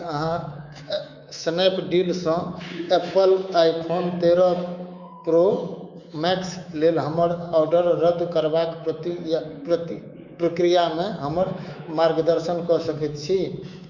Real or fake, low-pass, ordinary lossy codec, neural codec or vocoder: fake; 7.2 kHz; none; codec, 24 kHz, 3.1 kbps, DualCodec